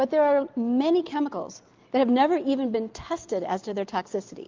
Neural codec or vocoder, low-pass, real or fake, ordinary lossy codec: none; 7.2 kHz; real; Opus, 24 kbps